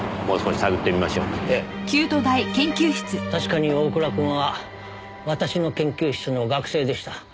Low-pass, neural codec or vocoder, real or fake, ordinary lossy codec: none; none; real; none